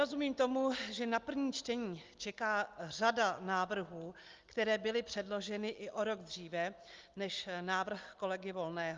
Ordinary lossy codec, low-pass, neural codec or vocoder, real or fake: Opus, 32 kbps; 7.2 kHz; none; real